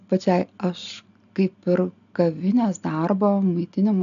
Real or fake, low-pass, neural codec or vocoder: real; 7.2 kHz; none